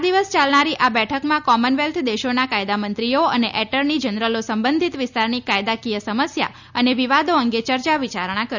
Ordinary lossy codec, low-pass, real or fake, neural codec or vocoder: none; 7.2 kHz; real; none